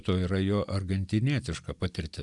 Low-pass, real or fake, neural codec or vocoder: 10.8 kHz; real; none